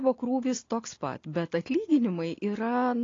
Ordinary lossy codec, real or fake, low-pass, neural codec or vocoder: AAC, 32 kbps; real; 7.2 kHz; none